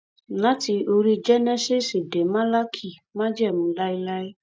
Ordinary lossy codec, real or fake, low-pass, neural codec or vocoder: none; real; none; none